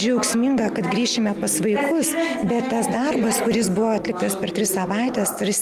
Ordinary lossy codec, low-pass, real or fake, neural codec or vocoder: Opus, 32 kbps; 14.4 kHz; real; none